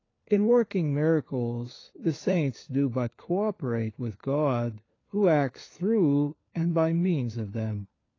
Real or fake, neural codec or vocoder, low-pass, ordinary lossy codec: fake; codec, 16 kHz, 4 kbps, FunCodec, trained on LibriTTS, 50 frames a second; 7.2 kHz; AAC, 32 kbps